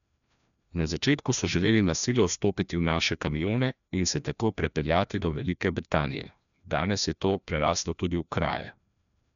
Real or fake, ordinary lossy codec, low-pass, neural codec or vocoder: fake; none; 7.2 kHz; codec, 16 kHz, 1 kbps, FreqCodec, larger model